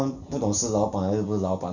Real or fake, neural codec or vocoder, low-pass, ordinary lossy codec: real; none; 7.2 kHz; none